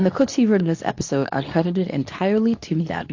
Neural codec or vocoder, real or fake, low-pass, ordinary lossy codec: codec, 24 kHz, 0.9 kbps, WavTokenizer, medium speech release version 2; fake; 7.2 kHz; AAC, 48 kbps